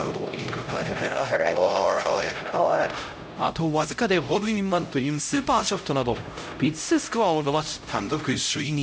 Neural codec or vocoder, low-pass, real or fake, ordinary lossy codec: codec, 16 kHz, 0.5 kbps, X-Codec, HuBERT features, trained on LibriSpeech; none; fake; none